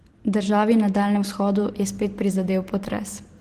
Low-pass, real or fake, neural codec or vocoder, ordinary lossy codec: 14.4 kHz; real; none; Opus, 16 kbps